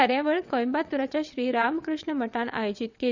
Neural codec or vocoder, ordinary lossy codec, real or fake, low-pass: vocoder, 22.05 kHz, 80 mel bands, WaveNeXt; none; fake; 7.2 kHz